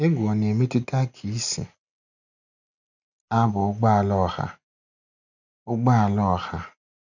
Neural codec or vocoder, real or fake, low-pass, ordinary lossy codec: none; real; 7.2 kHz; none